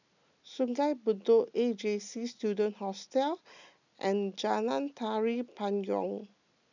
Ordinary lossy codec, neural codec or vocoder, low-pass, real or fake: none; none; 7.2 kHz; real